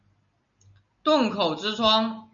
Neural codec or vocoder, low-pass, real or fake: none; 7.2 kHz; real